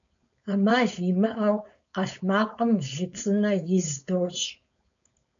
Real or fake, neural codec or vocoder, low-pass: fake; codec, 16 kHz, 4.8 kbps, FACodec; 7.2 kHz